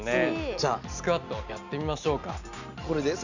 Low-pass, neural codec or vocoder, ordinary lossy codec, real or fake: 7.2 kHz; none; none; real